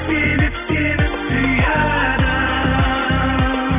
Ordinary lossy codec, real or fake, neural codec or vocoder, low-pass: none; real; none; 3.6 kHz